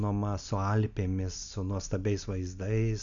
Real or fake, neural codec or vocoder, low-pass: real; none; 7.2 kHz